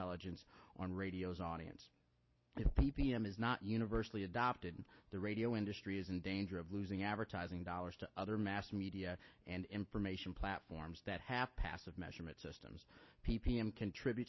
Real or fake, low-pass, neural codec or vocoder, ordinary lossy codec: real; 7.2 kHz; none; MP3, 24 kbps